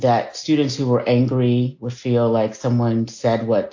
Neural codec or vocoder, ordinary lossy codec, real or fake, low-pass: none; AAC, 48 kbps; real; 7.2 kHz